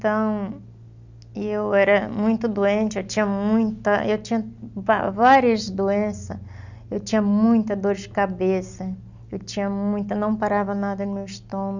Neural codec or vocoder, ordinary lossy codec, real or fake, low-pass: none; none; real; 7.2 kHz